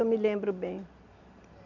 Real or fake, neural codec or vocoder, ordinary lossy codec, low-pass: real; none; none; 7.2 kHz